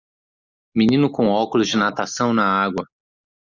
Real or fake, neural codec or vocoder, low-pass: real; none; 7.2 kHz